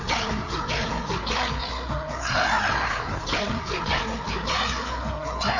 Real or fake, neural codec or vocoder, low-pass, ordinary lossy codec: fake; codec, 16 kHz, 4 kbps, FreqCodec, larger model; 7.2 kHz; none